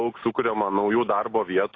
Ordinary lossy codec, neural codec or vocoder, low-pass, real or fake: AAC, 32 kbps; none; 7.2 kHz; real